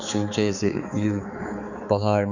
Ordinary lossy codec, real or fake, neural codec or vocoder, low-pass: none; fake; codec, 16 kHz, 4 kbps, X-Codec, HuBERT features, trained on balanced general audio; 7.2 kHz